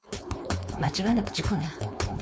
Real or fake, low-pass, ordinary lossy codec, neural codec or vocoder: fake; none; none; codec, 16 kHz, 4.8 kbps, FACodec